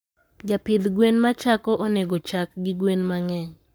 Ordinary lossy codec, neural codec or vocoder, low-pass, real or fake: none; codec, 44.1 kHz, 7.8 kbps, Pupu-Codec; none; fake